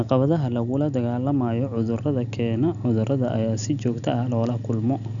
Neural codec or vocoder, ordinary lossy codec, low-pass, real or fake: none; none; 7.2 kHz; real